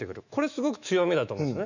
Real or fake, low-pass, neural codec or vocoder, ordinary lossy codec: fake; 7.2 kHz; autoencoder, 48 kHz, 128 numbers a frame, DAC-VAE, trained on Japanese speech; none